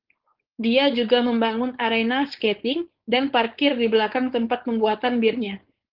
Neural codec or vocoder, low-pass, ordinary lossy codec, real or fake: codec, 16 kHz, 4.8 kbps, FACodec; 5.4 kHz; Opus, 16 kbps; fake